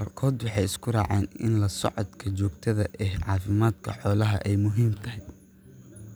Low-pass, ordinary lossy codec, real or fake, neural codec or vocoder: none; none; real; none